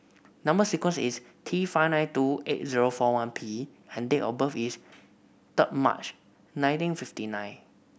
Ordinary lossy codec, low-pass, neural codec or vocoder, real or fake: none; none; none; real